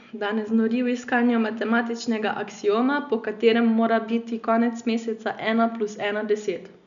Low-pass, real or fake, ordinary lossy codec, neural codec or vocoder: 7.2 kHz; real; none; none